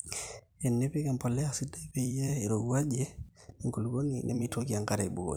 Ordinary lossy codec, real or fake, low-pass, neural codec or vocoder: none; fake; none; vocoder, 44.1 kHz, 128 mel bands every 256 samples, BigVGAN v2